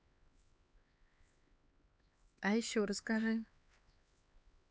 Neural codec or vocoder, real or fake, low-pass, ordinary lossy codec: codec, 16 kHz, 4 kbps, X-Codec, HuBERT features, trained on LibriSpeech; fake; none; none